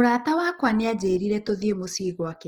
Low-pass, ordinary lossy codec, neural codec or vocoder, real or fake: 19.8 kHz; Opus, 16 kbps; none; real